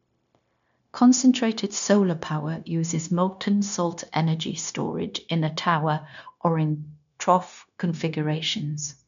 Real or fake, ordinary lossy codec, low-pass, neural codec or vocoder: fake; none; 7.2 kHz; codec, 16 kHz, 0.9 kbps, LongCat-Audio-Codec